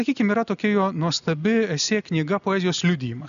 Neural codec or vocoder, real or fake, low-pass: none; real; 7.2 kHz